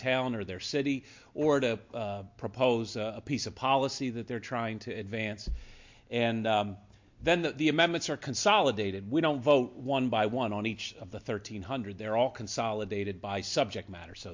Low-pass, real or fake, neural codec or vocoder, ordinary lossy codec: 7.2 kHz; real; none; MP3, 48 kbps